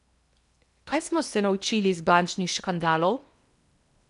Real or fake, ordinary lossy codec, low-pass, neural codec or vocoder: fake; none; 10.8 kHz; codec, 16 kHz in and 24 kHz out, 0.8 kbps, FocalCodec, streaming, 65536 codes